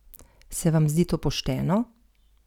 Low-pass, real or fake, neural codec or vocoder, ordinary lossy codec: 19.8 kHz; real; none; none